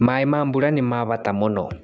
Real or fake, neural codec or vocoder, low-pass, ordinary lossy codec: real; none; none; none